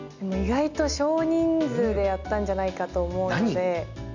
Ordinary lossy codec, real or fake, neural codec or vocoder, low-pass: none; real; none; 7.2 kHz